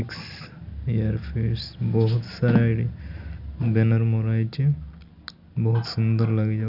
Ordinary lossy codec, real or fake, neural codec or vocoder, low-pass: none; real; none; 5.4 kHz